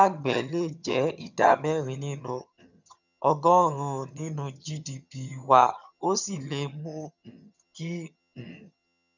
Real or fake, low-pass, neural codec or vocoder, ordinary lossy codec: fake; 7.2 kHz; vocoder, 22.05 kHz, 80 mel bands, HiFi-GAN; none